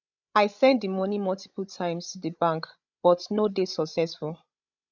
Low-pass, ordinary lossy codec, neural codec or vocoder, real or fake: 7.2 kHz; none; codec, 16 kHz, 16 kbps, FreqCodec, larger model; fake